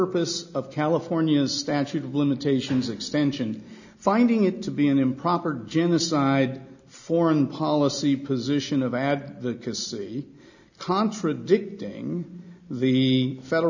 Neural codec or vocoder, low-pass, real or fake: none; 7.2 kHz; real